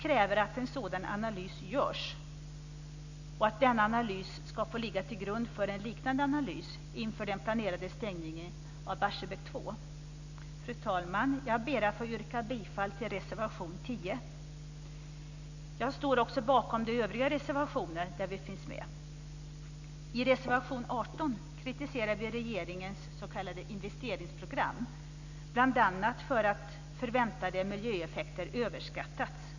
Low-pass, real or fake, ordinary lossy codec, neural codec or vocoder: 7.2 kHz; real; none; none